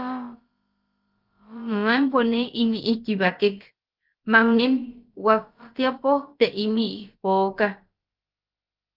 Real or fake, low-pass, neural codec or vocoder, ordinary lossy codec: fake; 5.4 kHz; codec, 16 kHz, about 1 kbps, DyCAST, with the encoder's durations; Opus, 24 kbps